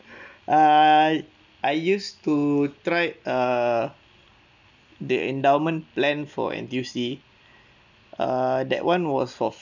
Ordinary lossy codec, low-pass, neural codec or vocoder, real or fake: none; 7.2 kHz; none; real